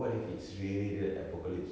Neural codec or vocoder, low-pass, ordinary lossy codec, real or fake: none; none; none; real